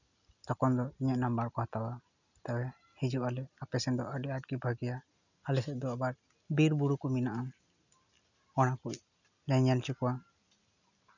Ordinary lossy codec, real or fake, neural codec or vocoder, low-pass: none; real; none; 7.2 kHz